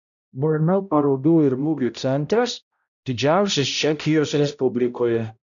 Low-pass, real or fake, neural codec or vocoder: 7.2 kHz; fake; codec, 16 kHz, 0.5 kbps, X-Codec, HuBERT features, trained on balanced general audio